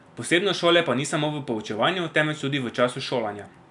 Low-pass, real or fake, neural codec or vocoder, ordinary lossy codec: 10.8 kHz; real; none; none